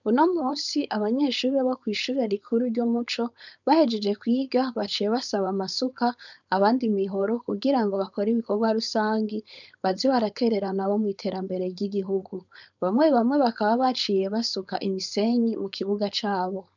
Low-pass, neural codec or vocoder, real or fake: 7.2 kHz; codec, 16 kHz, 4.8 kbps, FACodec; fake